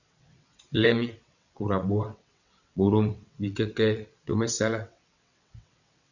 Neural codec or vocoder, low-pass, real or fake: vocoder, 22.05 kHz, 80 mel bands, WaveNeXt; 7.2 kHz; fake